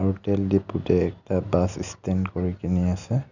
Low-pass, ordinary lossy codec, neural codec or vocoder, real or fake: 7.2 kHz; none; none; real